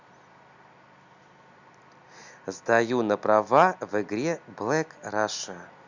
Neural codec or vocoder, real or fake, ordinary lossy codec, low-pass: none; real; Opus, 64 kbps; 7.2 kHz